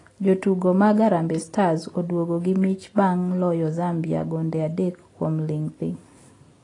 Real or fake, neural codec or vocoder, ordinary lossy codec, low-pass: real; none; AAC, 32 kbps; 10.8 kHz